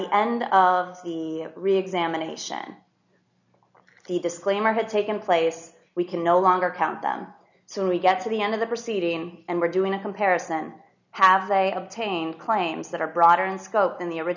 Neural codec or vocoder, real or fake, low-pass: none; real; 7.2 kHz